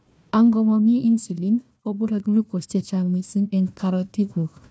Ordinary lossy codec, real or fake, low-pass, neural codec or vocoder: none; fake; none; codec, 16 kHz, 1 kbps, FunCodec, trained on Chinese and English, 50 frames a second